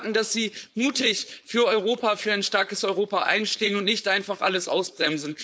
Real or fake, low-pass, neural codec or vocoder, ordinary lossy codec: fake; none; codec, 16 kHz, 4.8 kbps, FACodec; none